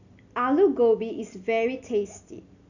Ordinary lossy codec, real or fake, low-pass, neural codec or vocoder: none; real; 7.2 kHz; none